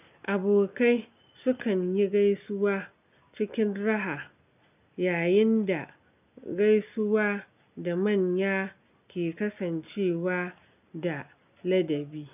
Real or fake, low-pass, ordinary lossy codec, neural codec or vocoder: real; 3.6 kHz; none; none